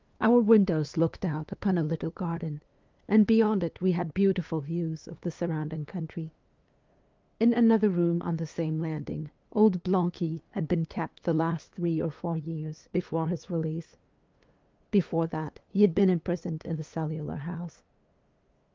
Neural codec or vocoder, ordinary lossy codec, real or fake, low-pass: codec, 16 kHz, 2 kbps, FunCodec, trained on LibriTTS, 25 frames a second; Opus, 24 kbps; fake; 7.2 kHz